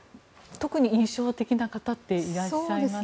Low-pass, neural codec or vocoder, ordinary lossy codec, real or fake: none; none; none; real